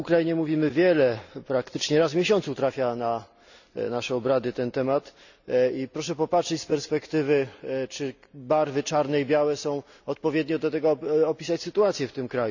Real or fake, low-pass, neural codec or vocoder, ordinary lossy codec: real; 7.2 kHz; none; none